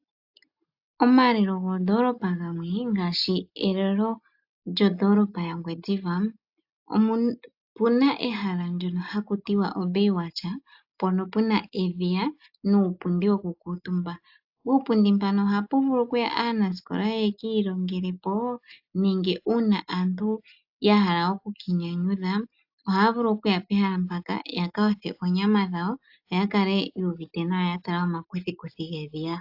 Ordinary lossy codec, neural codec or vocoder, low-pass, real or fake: AAC, 48 kbps; none; 5.4 kHz; real